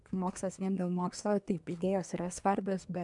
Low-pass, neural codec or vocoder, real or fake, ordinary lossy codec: 10.8 kHz; codec, 24 kHz, 1 kbps, SNAC; fake; MP3, 96 kbps